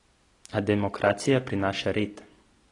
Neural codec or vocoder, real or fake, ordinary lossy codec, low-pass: none; real; AAC, 32 kbps; 10.8 kHz